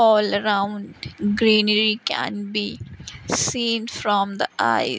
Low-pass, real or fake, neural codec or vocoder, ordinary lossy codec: none; real; none; none